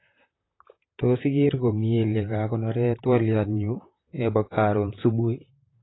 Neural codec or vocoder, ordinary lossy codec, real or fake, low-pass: vocoder, 44.1 kHz, 128 mel bands, Pupu-Vocoder; AAC, 16 kbps; fake; 7.2 kHz